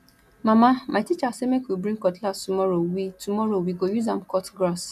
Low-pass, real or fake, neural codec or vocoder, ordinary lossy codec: 14.4 kHz; real; none; none